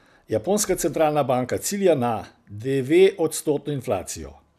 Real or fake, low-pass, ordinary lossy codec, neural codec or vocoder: real; 14.4 kHz; none; none